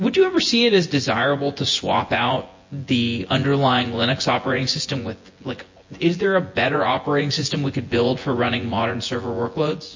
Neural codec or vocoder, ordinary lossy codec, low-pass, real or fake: vocoder, 24 kHz, 100 mel bands, Vocos; MP3, 32 kbps; 7.2 kHz; fake